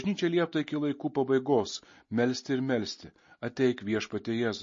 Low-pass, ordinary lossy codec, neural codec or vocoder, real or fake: 7.2 kHz; MP3, 32 kbps; none; real